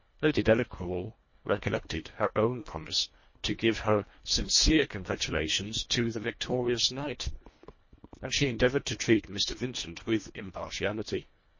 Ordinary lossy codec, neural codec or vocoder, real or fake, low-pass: MP3, 32 kbps; codec, 24 kHz, 1.5 kbps, HILCodec; fake; 7.2 kHz